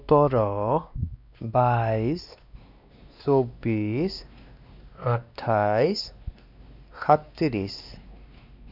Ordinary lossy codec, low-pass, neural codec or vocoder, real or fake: none; 5.4 kHz; codec, 16 kHz, 2 kbps, X-Codec, WavLM features, trained on Multilingual LibriSpeech; fake